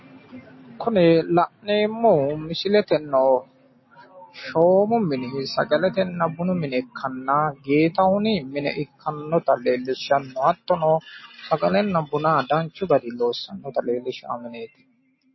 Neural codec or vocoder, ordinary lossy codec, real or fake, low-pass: none; MP3, 24 kbps; real; 7.2 kHz